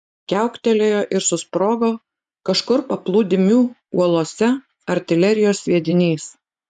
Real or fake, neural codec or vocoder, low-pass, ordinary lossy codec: fake; vocoder, 24 kHz, 100 mel bands, Vocos; 10.8 kHz; MP3, 96 kbps